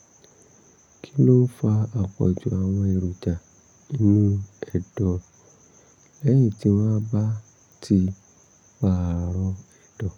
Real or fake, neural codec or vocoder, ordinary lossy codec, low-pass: real; none; none; 19.8 kHz